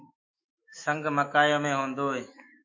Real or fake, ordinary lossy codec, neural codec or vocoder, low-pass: fake; MP3, 32 kbps; autoencoder, 48 kHz, 128 numbers a frame, DAC-VAE, trained on Japanese speech; 7.2 kHz